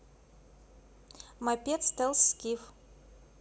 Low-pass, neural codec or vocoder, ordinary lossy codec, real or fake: none; none; none; real